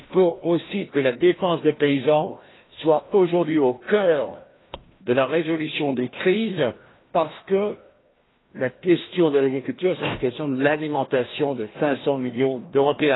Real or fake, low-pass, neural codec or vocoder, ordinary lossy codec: fake; 7.2 kHz; codec, 16 kHz, 1 kbps, FreqCodec, larger model; AAC, 16 kbps